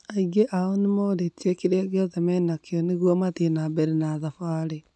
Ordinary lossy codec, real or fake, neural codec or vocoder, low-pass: none; real; none; none